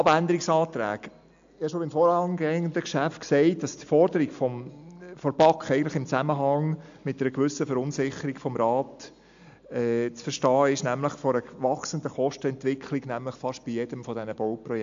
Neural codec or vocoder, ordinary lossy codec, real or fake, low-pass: none; AAC, 64 kbps; real; 7.2 kHz